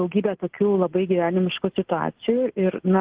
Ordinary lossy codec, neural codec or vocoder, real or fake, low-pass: Opus, 16 kbps; none; real; 3.6 kHz